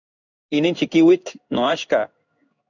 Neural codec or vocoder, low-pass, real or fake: codec, 16 kHz in and 24 kHz out, 1 kbps, XY-Tokenizer; 7.2 kHz; fake